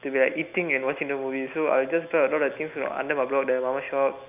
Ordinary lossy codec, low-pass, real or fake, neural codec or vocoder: none; 3.6 kHz; real; none